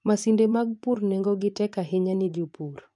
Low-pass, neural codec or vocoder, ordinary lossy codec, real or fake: 10.8 kHz; vocoder, 24 kHz, 100 mel bands, Vocos; none; fake